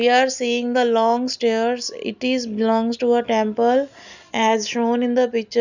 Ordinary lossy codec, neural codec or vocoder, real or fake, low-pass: none; none; real; 7.2 kHz